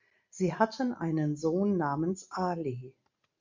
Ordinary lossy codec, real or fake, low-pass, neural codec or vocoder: MP3, 64 kbps; real; 7.2 kHz; none